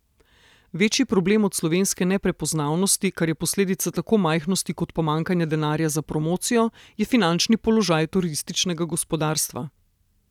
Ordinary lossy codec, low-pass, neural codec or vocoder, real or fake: none; 19.8 kHz; none; real